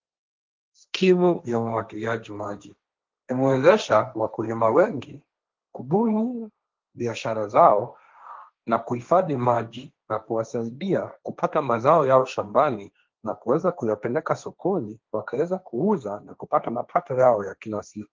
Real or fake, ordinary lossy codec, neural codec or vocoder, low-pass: fake; Opus, 32 kbps; codec, 16 kHz, 1.1 kbps, Voila-Tokenizer; 7.2 kHz